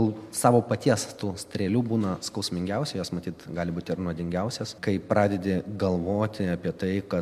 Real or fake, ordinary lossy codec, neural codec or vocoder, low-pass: real; MP3, 96 kbps; none; 14.4 kHz